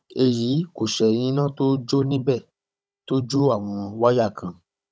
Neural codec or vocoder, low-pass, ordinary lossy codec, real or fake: codec, 16 kHz, 16 kbps, FunCodec, trained on Chinese and English, 50 frames a second; none; none; fake